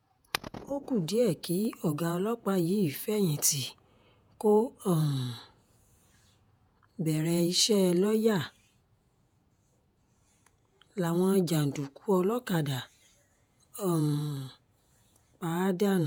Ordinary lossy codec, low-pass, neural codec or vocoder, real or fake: none; none; vocoder, 48 kHz, 128 mel bands, Vocos; fake